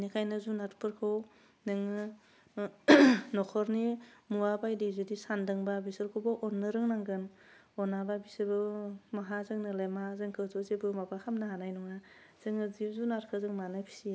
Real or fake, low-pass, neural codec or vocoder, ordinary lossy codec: real; none; none; none